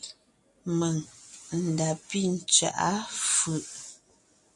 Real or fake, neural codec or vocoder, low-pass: real; none; 10.8 kHz